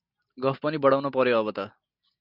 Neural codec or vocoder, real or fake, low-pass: none; real; 5.4 kHz